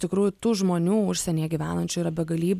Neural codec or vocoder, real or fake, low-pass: none; real; 14.4 kHz